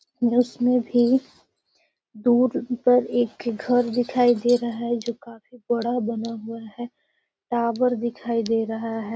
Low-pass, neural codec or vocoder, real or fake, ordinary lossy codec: none; none; real; none